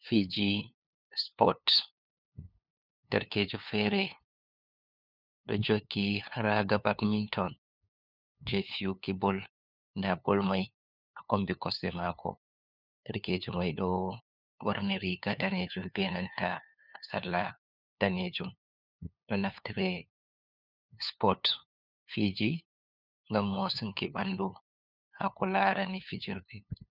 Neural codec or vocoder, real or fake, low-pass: codec, 16 kHz, 2 kbps, FunCodec, trained on LibriTTS, 25 frames a second; fake; 5.4 kHz